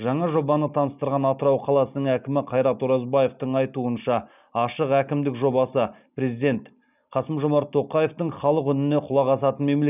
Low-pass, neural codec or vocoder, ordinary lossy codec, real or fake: 3.6 kHz; none; none; real